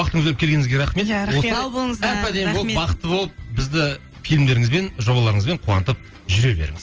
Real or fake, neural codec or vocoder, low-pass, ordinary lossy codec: real; none; 7.2 kHz; Opus, 24 kbps